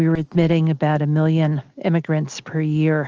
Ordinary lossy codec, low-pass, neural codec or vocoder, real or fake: Opus, 24 kbps; 7.2 kHz; none; real